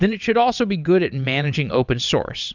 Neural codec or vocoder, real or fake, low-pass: vocoder, 22.05 kHz, 80 mel bands, WaveNeXt; fake; 7.2 kHz